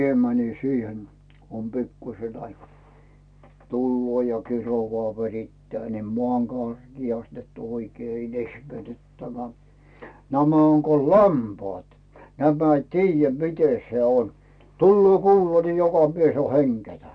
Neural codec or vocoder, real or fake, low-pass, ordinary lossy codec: none; real; 9.9 kHz; none